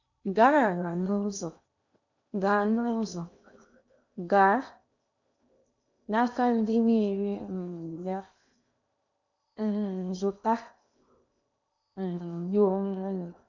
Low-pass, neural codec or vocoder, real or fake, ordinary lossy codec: 7.2 kHz; codec, 16 kHz in and 24 kHz out, 0.8 kbps, FocalCodec, streaming, 65536 codes; fake; none